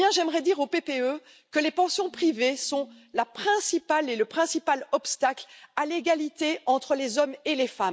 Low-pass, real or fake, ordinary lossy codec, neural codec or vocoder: none; real; none; none